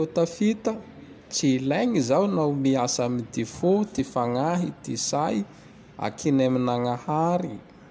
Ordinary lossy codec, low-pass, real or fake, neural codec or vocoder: none; none; real; none